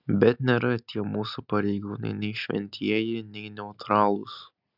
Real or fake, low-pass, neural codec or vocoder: real; 5.4 kHz; none